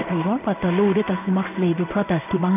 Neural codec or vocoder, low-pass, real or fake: codec, 16 kHz in and 24 kHz out, 1 kbps, XY-Tokenizer; 3.6 kHz; fake